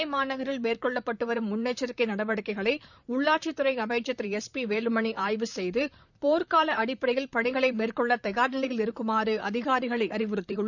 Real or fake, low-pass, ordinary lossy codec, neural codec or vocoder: fake; 7.2 kHz; none; vocoder, 44.1 kHz, 128 mel bands, Pupu-Vocoder